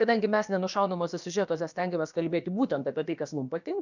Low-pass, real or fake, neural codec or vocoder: 7.2 kHz; fake; codec, 16 kHz, about 1 kbps, DyCAST, with the encoder's durations